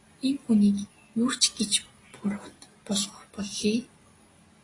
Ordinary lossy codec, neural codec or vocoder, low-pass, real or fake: AAC, 32 kbps; none; 10.8 kHz; real